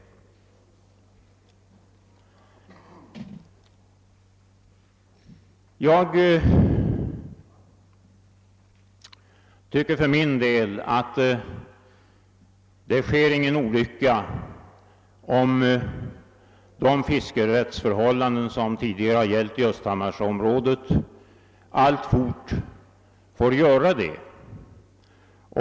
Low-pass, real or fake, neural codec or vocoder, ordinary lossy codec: none; real; none; none